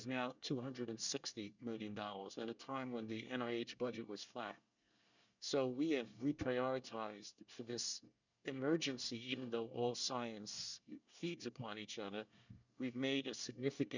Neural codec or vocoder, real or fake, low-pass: codec, 24 kHz, 1 kbps, SNAC; fake; 7.2 kHz